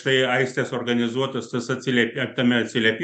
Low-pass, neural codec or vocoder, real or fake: 10.8 kHz; none; real